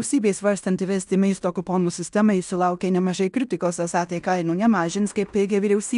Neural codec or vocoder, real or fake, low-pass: codec, 16 kHz in and 24 kHz out, 0.9 kbps, LongCat-Audio-Codec, fine tuned four codebook decoder; fake; 10.8 kHz